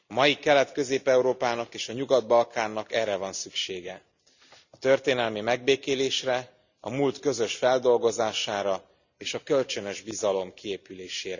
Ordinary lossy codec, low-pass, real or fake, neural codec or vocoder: none; 7.2 kHz; real; none